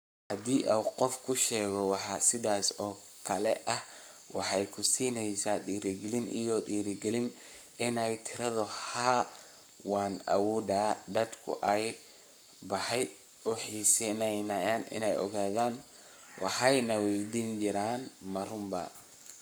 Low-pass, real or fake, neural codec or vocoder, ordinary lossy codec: none; fake; codec, 44.1 kHz, 7.8 kbps, Pupu-Codec; none